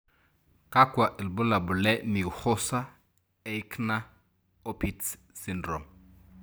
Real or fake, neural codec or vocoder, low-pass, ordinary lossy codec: real; none; none; none